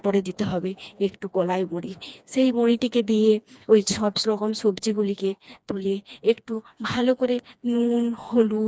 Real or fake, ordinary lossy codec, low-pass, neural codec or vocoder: fake; none; none; codec, 16 kHz, 2 kbps, FreqCodec, smaller model